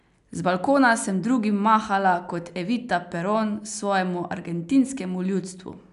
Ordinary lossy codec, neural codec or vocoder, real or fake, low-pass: none; none; real; 10.8 kHz